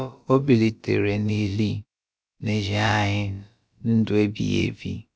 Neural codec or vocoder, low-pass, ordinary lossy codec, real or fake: codec, 16 kHz, about 1 kbps, DyCAST, with the encoder's durations; none; none; fake